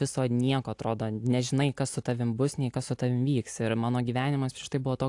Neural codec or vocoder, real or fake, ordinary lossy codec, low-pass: none; real; AAC, 64 kbps; 10.8 kHz